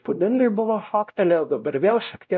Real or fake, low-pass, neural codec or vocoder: fake; 7.2 kHz; codec, 16 kHz, 0.5 kbps, X-Codec, WavLM features, trained on Multilingual LibriSpeech